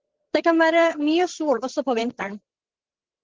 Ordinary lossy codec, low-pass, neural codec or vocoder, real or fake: Opus, 16 kbps; 7.2 kHz; codec, 16 kHz, 16 kbps, FreqCodec, larger model; fake